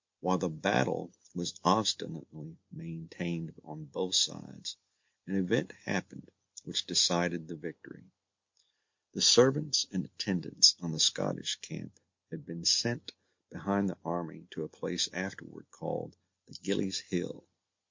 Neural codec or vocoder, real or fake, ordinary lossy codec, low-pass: none; real; MP3, 48 kbps; 7.2 kHz